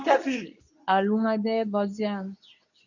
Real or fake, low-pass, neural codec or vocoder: fake; 7.2 kHz; codec, 24 kHz, 0.9 kbps, WavTokenizer, medium speech release version 2